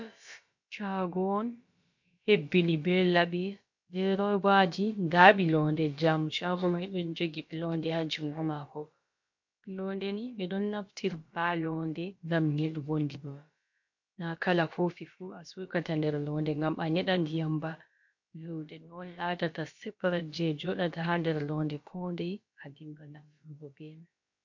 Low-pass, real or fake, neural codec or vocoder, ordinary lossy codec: 7.2 kHz; fake; codec, 16 kHz, about 1 kbps, DyCAST, with the encoder's durations; MP3, 48 kbps